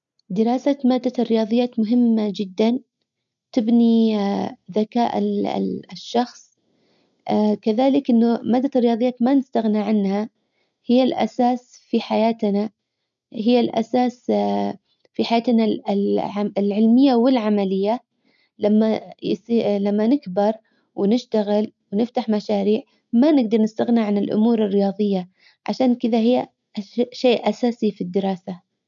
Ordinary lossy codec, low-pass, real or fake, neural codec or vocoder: none; 7.2 kHz; real; none